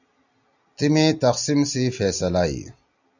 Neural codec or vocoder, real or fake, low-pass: none; real; 7.2 kHz